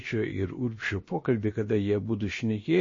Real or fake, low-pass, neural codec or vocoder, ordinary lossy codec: fake; 7.2 kHz; codec, 16 kHz, about 1 kbps, DyCAST, with the encoder's durations; MP3, 32 kbps